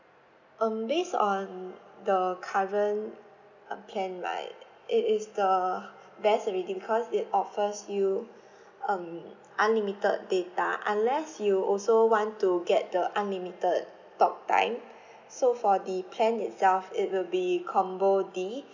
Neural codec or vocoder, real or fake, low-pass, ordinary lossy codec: none; real; 7.2 kHz; none